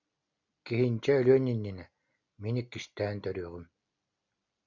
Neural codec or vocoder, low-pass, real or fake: none; 7.2 kHz; real